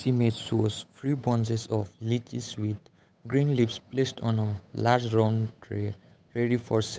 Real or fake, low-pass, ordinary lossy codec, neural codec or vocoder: fake; none; none; codec, 16 kHz, 8 kbps, FunCodec, trained on Chinese and English, 25 frames a second